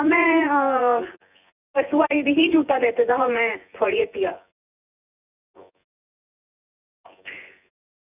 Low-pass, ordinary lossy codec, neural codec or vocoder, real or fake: 3.6 kHz; none; vocoder, 24 kHz, 100 mel bands, Vocos; fake